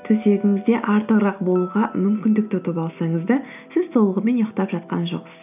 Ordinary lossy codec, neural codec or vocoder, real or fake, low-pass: none; none; real; 3.6 kHz